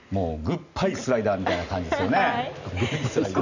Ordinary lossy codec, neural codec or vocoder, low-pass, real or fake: none; none; 7.2 kHz; real